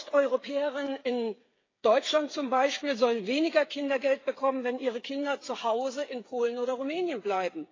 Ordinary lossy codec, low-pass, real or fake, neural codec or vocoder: AAC, 32 kbps; 7.2 kHz; fake; codec, 16 kHz, 8 kbps, FreqCodec, smaller model